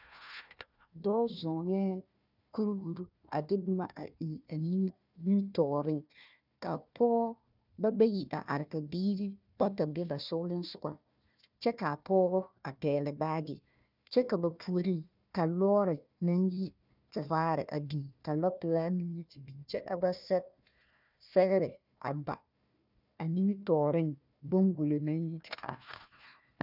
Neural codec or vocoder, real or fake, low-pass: codec, 16 kHz, 1 kbps, FunCodec, trained on Chinese and English, 50 frames a second; fake; 5.4 kHz